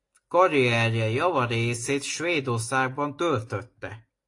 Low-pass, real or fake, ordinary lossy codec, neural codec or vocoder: 10.8 kHz; real; AAC, 48 kbps; none